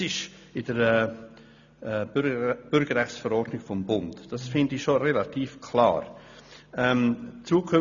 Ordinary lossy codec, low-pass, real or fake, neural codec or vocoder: none; 7.2 kHz; real; none